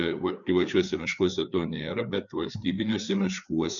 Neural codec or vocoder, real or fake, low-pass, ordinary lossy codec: codec, 16 kHz, 4 kbps, FreqCodec, larger model; fake; 7.2 kHz; MP3, 96 kbps